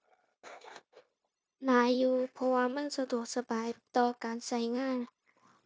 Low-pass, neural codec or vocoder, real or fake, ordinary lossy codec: none; codec, 16 kHz, 0.9 kbps, LongCat-Audio-Codec; fake; none